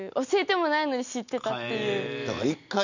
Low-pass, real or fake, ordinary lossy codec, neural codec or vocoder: 7.2 kHz; real; none; none